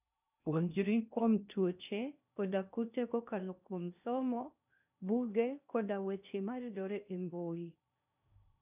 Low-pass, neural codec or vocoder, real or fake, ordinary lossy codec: 3.6 kHz; codec, 16 kHz in and 24 kHz out, 0.6 kbps, FocalCodec, streaming, 2048 codes; fake; none